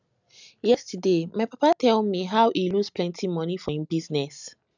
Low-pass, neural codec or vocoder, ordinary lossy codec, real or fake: 7.2 kHz; none; none; real